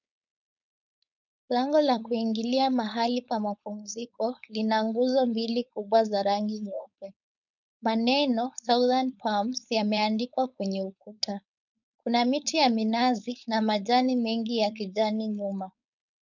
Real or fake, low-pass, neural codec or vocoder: fake; 7.2 kHz; codec, 16 kHz, 4.8 kbps, FACodec